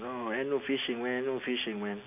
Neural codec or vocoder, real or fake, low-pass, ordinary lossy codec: none; real; 3.6 kHz; none